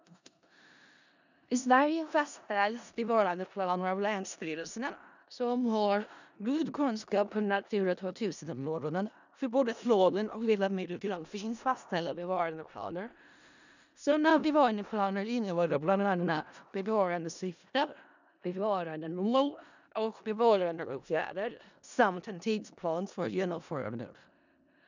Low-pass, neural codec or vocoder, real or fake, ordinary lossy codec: 7.2 kHz; codec, 16 kHz in and 24 kHz out, 0.4 kbps, LongCat-Audio-Codec, four codebook decoder; fake; none